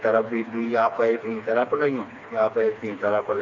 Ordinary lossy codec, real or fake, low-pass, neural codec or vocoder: none; fake; 7.2 kHz; codec, 16 kHz, 2 kbps, FreqCodec, smaller model